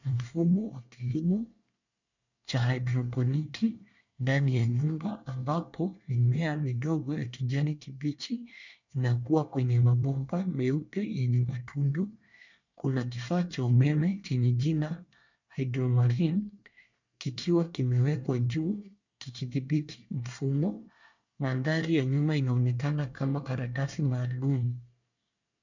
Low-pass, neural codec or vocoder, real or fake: 7.2 kHz; codec, 24 kHz, 1 kbps, SNAC; fake